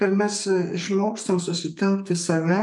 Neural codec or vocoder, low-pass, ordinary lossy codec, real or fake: codec, 44.1 kHz, 2.6 kbps, SNAC; 10.8 kHz; MP3, 64 kbps; fake